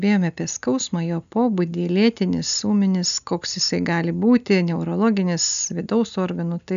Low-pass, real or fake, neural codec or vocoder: 7.2 kHz; real; none